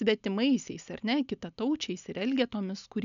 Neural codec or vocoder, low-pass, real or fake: none; 7.2 kHz; real